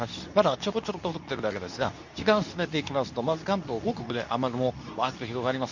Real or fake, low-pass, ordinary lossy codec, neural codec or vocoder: fake; 7.2 kHz; none; codec, 24 kHz, 0.9 kbps, WavTokenizer, medium speech release version 2